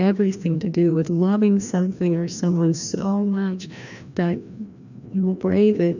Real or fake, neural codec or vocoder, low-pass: fake; codec, 16 kHz, 1 kbps, FreqCodec, larger model; 7.2 kHz